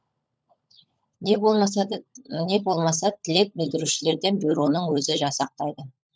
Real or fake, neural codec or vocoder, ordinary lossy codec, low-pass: fake; codec, 16 kHz, 16 kbps, FunCodec, trained on LibriTTS, 50 frames a second; none; none